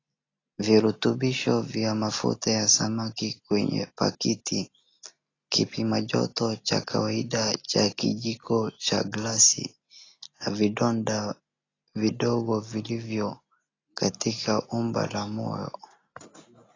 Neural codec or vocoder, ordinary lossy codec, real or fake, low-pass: none; AAC, 32 kbps; real; 7.2 kHz